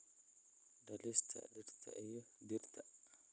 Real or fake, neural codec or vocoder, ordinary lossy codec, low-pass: real; none; none; none